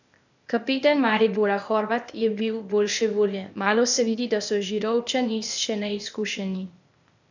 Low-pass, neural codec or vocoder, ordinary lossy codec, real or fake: 7.2 kHz; codec, 16 kHz, 0.8 kbps, ZipCodec; none; fake